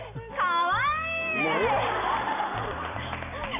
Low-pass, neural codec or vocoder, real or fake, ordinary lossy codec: 3.6 kHz; none; real; none